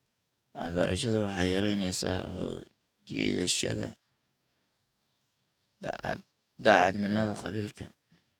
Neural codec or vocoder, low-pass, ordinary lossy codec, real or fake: codec, 44.1 kHz, 2.6 kbps, DAC; 19.8 kHz; none; fake